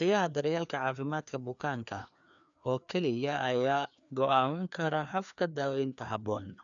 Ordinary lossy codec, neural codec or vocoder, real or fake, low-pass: none; codec, 16 kHz, 2 kbps, FreqCodec, larger model; fake; 7.2 kHz